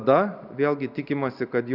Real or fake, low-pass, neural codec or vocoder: real; 5.4 kHz; none